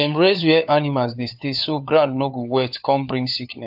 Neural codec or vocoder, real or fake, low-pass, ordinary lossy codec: codec, 16 kHz, 4 kbps, FunCodec, trained on LibriTTS, 50 frames a second; fake; 5.4 kHz; AAC, 48 kbps